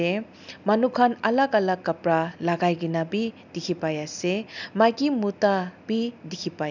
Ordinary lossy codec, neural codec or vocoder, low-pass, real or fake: none; none; 7.2 kHz; real